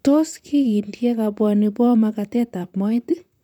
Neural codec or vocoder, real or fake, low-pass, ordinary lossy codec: vocoder, 44.1 kHz, 128 mel bands, Pupu-Vocoder; fake; 19.8 kHz; none